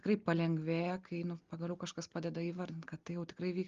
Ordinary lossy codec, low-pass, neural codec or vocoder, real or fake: Opus, 32 kbps; 7.2 kHz; none; real